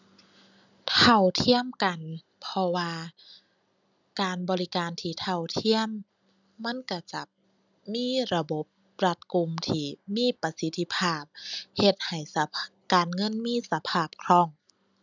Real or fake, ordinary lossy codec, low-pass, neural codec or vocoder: real; none; 7.2 kHz; none